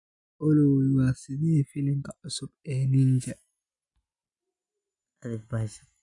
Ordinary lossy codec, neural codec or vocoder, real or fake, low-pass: none; none; real; 10.8 kHz